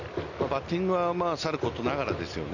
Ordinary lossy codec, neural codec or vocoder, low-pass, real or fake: none; none; 7.2 kHz; real